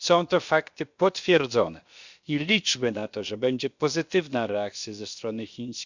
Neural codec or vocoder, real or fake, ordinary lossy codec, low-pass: codec, 16 kHz, about 1 kbps, DyCAST, with the encoder's durations; fake; Opus, 64 kbps; 7.2 kHz